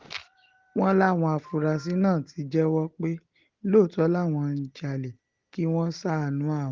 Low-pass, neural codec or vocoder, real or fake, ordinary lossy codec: 7.2 kHz; none; real; Opus, 16 kbps